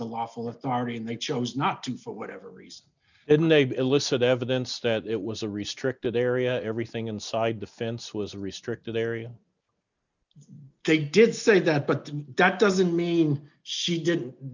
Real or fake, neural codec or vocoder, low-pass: real; none; 7.2 kHz